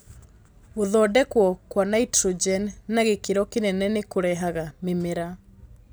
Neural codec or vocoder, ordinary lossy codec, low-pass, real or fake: none; none; none; real